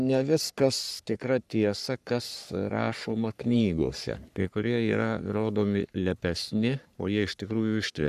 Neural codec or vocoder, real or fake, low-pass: codec, 44.1 kHz, 3.4 kbps, Pupu-Codec; fake; 14.4 kHz